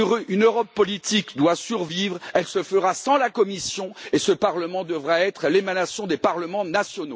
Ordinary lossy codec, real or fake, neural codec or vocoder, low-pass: none; real; none; none